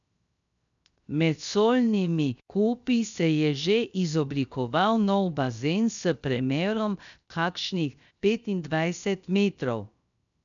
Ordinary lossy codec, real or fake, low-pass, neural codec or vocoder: none; fake; 7.2 kHz; codec, 16 kHz, 0.7 kbps, FocalCodec